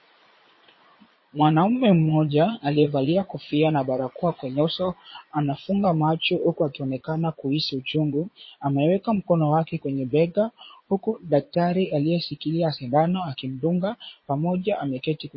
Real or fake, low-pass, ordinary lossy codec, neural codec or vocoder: fake; 7.2 kHz; MP3, 24 kbps; vocoder, 22.05 kHz, 80 mel bands, Vocos